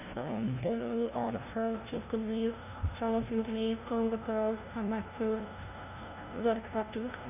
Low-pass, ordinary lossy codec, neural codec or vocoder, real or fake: 3.6 kHz; none; codec, 16 kHz, 0.5 kbps, FunCodec, trained on LibriTTS, 25 frames a second; fake